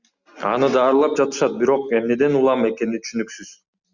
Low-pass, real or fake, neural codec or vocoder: 7.2 kHz; real; none